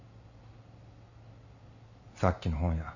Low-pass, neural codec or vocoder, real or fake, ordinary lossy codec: 7.2 kHz; none; real; none